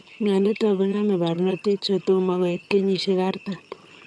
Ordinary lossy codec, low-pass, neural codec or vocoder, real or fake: none; none; vocoder, 22.05 kHz, 80 mel bands, HiFi-GAN; fake